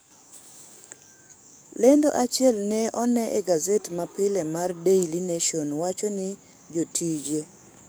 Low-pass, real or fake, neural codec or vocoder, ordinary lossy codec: none; fake; codec, 44.1 kHz, 7.8 kbps, DAC; none